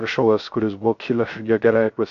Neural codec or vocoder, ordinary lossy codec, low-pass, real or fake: codec, 16 kHz, 0.3 kbps, FocalCodec; MP3, 64 kbps; 7.2 kHz; fake